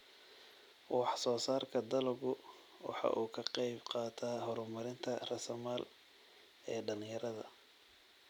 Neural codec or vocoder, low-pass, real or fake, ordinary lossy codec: none; 19.8 kHz; real; none